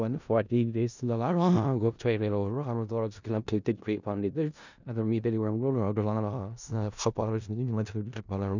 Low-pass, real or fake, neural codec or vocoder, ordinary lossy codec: 7.2 kHz; fake; codec, 16 kHz in and 24 kHz out, 0.4 kbps, LongCat-Audio-Codec, four codebook decoder; none